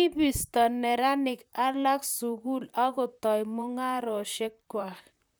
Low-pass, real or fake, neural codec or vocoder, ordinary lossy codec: none; fake; vocoder, 44.1 kHz, 128 mel bands, Pupu-Vocoder; none